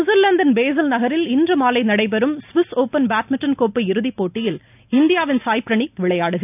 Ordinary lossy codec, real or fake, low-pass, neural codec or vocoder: none; real; 3.6 kHz; none